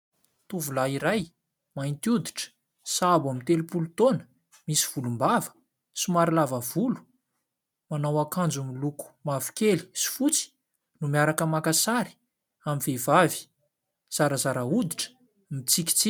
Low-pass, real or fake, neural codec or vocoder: 19.8 kHz; real; none